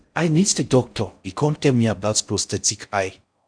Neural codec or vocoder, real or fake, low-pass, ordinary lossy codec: codec, 16 kHz in and 24 kHz out, 0.6 kbps, FocalCodec, streaming, 4096 codes; fake; 9.9 kHz; none